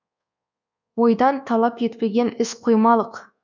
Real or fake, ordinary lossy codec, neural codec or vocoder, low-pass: fake; none; codec, 24 kHz, 1.2 kbps, DualCodec; 7.2 kHz